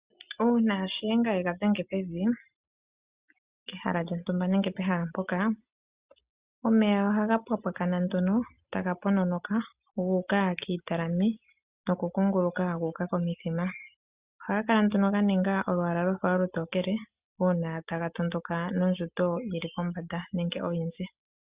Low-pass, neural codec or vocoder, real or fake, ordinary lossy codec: 3.6 kHz; none; real; Opus, 64 kbps